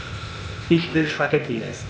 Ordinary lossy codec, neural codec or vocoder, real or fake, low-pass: none; codec, 16 kHz, 0.8 kbps, ZipCodec; fake; none